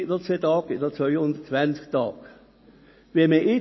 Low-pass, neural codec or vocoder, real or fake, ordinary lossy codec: 7.2 kHz; none; real; MP3, 24 kbps